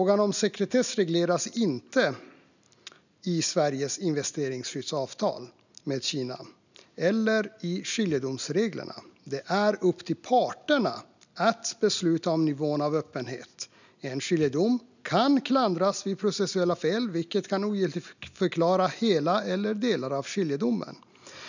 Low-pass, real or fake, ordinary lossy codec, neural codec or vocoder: 7.2 kHz; real; none; none